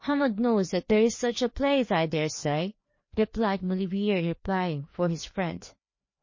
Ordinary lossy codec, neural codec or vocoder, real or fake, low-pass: MP3, 32 kbps; codec, 16 kHz, 2 kbps, FreqCodec, larger model; fake; 7.2 kHz